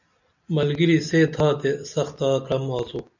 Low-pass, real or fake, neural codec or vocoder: 7.2 kHz; real; none